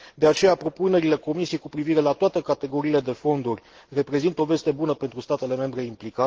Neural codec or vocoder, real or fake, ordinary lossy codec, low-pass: none; real; Opus, 16 kbps; 7.2 kHz